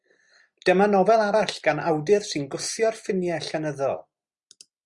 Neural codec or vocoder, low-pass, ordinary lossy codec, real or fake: none; 10.8 kHz; Opus, 64 kbps; real